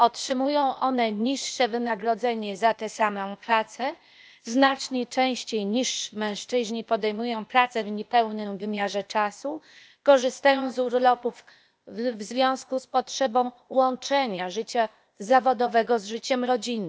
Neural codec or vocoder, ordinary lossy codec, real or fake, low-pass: codec, 16 kHz, 0.8 kbps, ZipCodec; none; fake; none